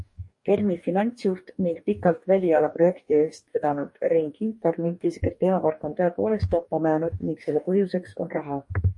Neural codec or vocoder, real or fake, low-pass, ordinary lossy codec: codec, 44.1 kHz, 2.6 kbps, DAC; fake; 10.8 kHz; MP3, 48 kbps